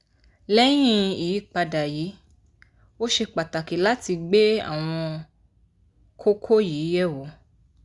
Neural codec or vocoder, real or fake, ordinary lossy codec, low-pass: none; real; Opus, 64 kbps; 10.8 kHz